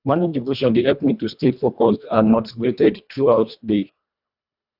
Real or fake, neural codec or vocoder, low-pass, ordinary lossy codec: fake; codec, 24 kHz, 1.5 kbps, HILCodec; 5.4 kHz; none